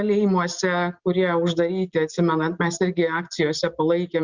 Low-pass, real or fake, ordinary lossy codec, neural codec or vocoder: 7.2 kHz; real; Opus, 64 kbps; none